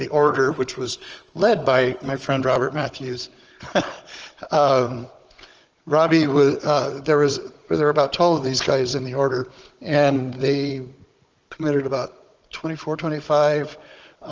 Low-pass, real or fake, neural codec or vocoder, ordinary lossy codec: 7.2 kHz; fake; codec, 16 kHz, 16 kbps, FunCodec, trained on Chinese and English, 50 frames a second; Opus, 24 kbps